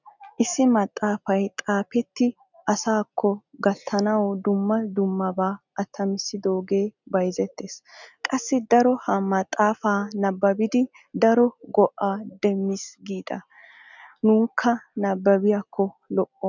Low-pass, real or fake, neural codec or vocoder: 7.2 kHz; real; none